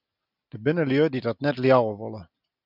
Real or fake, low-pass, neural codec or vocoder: real; 5.4 kHz; none